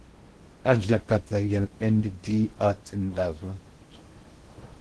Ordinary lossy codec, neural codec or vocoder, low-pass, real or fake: Opus, 16 kbps; codec, 16 kHz in and 24 kHz out, 0.6 kbps, FocalCodec, streaming, 2048 codes; 10.8 kHz; fake